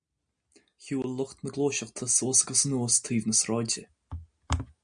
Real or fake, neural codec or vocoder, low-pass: real; none; 9.9 kHz